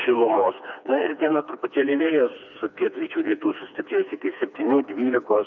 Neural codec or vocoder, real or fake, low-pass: codec, 16 kHz, 2 kbps, FreqCodec, smaller model; fake; 7.2 kHz